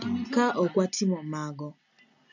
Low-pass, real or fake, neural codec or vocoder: 7.2 kHz; real; none